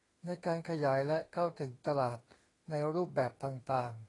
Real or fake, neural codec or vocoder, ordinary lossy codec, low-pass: fake; autoencoder, 48 kHz, 32 numbers a frame, DAC-VAE, trained on Japanese speech; AAC, 32 kbps; 10.8 kHz